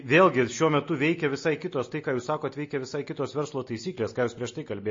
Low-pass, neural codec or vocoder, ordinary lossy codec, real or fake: 7.2 kHz; none; MP3, 32 kbps; real